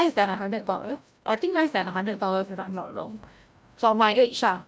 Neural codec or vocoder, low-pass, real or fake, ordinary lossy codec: codec, 16 kHz, 0.5 kbps, FreqCodec, larger model; none; fake; none